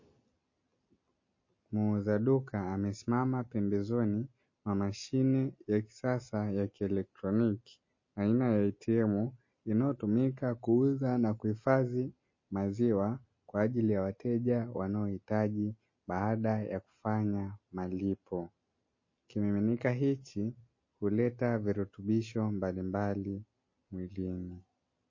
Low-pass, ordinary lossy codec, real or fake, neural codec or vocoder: 7.2 kHz; MP3, 32 kbps; real; none